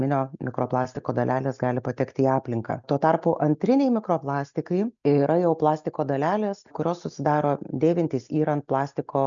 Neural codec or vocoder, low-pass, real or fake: none; 7.2 kHz; real